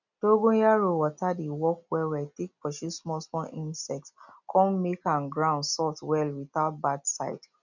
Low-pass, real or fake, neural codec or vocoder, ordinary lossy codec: 7.2 kHz; real; none; none